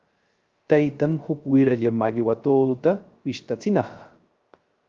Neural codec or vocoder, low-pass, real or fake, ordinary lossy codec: codec, 16 kHz, 0.3 kbps, FocalCodec; 7.2 kHz; fake; Opus, 32 kbps